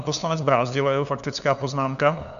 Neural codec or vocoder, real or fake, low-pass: codec, 16 kHz, 2 kbps, FunCodec, trained on LibriTTS, 25 frames a second; fake; 7.2 kHz